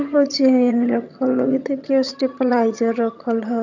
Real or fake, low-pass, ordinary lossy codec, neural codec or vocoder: fake; 7.2 kHz; none; vocoder, 22.05 kHz, 80 mel bands, HiFi-GAN